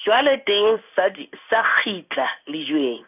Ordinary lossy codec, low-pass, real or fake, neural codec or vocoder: none; 3.6 kHz; fake; codec, 16 kHz in and 24 kHz out, 1 kbps, XY-Tokenizer